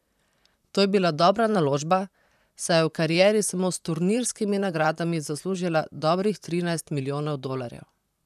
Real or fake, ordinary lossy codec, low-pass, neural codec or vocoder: fake; none; 14.4 kHz; vocoder, 44.1 kHz, 128 mel bands, Pupu-Vocoder